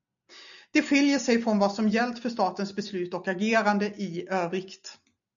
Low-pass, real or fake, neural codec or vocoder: 7.2 kHz; real; none